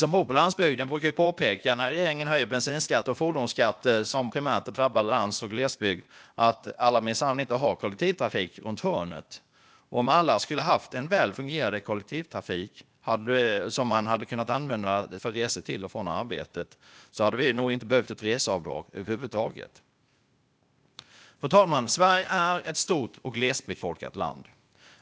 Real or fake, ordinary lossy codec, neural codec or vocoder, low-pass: fake; none; codec, 16 kHz, 0.8 kbps, ZipCodec; none